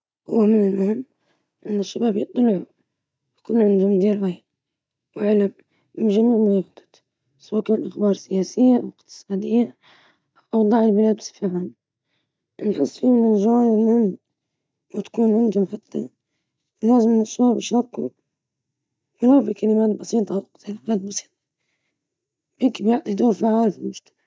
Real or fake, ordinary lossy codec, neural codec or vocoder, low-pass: real; none; none; none